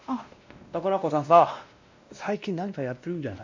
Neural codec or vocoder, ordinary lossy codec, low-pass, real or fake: codec, 16 kHz, 1 kbps, X-Codec, WavLM features, trained on Multilingual LibriSpeech; MP3, 64 kbps; 7.2 kHz; fake